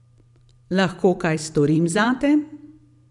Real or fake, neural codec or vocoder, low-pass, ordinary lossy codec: fake; vocoder, 44.1 kHz, 128 mel bands every 512 samples, BigVGAN v2; 10.8 kHz; none